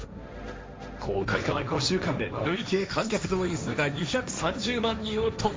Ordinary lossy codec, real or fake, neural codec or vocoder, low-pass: none; fake; codec, 16 kHz, 1.1 kbps, Voila-Tokenizer; none